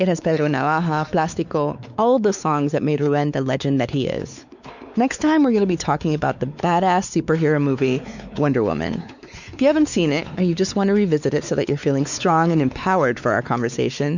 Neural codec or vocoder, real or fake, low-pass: codec, 16 kHz, 4 kbps, X-Codec, WavLM features, trained on Multilingual LibriSpeech; fake; 7.2 kHz